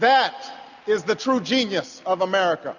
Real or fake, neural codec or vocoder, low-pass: real; none; 7.2 kHz